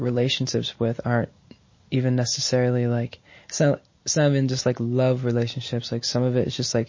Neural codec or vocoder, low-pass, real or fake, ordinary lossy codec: none; 7.2 kHz; real; MP3, 32 kbps